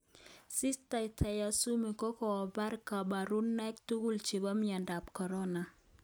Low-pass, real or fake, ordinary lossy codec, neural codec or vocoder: none; real; none; none